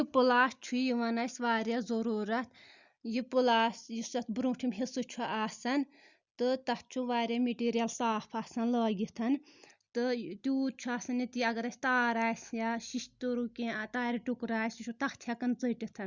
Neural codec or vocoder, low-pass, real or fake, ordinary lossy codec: none; 7.2 kHz; real; Opus, 64 kbps